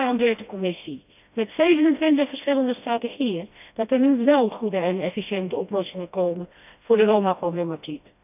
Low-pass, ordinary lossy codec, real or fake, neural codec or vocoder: 3.6 kHz; none; fake; codec, 16 kHz, 1 kbps, FreqCodec, smaller model